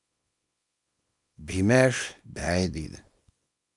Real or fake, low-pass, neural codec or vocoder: fake; 10.8 kHz; codec, 24 kHz, 0.9 kbps, WavTokenizer, small release